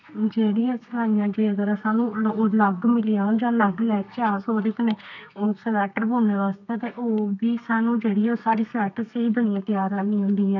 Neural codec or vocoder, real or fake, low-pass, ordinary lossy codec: codec, 32 kHz, 1.9 kbps, SNAC; fake; 7.2 kHz; none